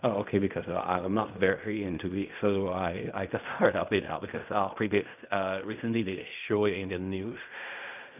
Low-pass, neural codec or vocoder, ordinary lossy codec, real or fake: 3.6 kHz; codec, 16 kHz in and 24 kHz out, 0.4 kbps, LongCat-Audio-Codec, fine tuned four codebook decoder; none; fake